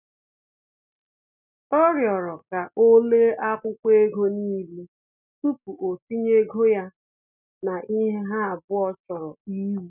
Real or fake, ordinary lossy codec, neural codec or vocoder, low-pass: real; none; none; 3.6 kHz